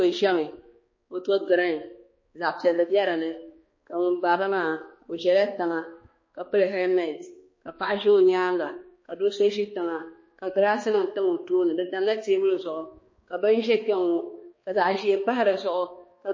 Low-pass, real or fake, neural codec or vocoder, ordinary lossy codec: 7.2 kHz; fake; codec, 16 kHz, 2 kbps, X-Codec, HuBERT features, trained on balanced general audio; MP3, 32 kbps